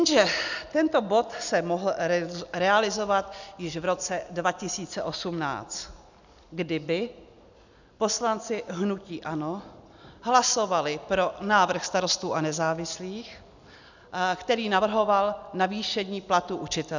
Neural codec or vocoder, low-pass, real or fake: none; 7.2 kHz; real